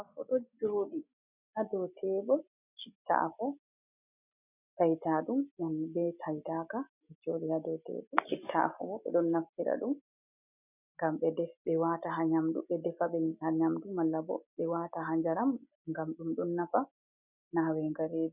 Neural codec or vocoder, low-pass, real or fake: none; 3.6 kHz; real